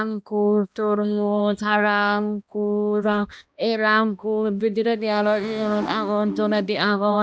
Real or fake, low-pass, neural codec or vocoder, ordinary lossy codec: fake; none; codec, 16 kHz, 1 kbps, X-Codec, HuBERT features, trained on balanced general audio; none